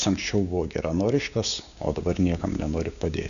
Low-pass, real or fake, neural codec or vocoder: 7.2 kHz; fake; codec, 16 kHz, 6 kbps, DAC